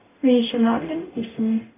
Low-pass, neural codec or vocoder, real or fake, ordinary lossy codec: 3.6 kHz; codec, 44.1 kHz, 0.9 kbps, DAC; fake; AAC, 16 kbps